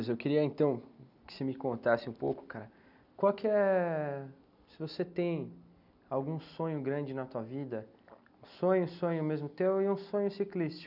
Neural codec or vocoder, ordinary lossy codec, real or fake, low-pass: none; none; real; 5.4 kHz